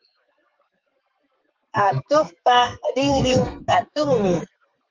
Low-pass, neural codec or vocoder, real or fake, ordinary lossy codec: 7.2 kHz; codec, 32 kHz, 1.9 kbps, SNAC; fake; Opus, 32 kbps